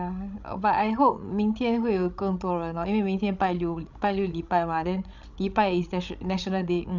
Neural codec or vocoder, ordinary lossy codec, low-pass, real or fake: codec, 16 kHz, 16 kbps, FreqCodec, larger model; none; 7.2 kHz; fake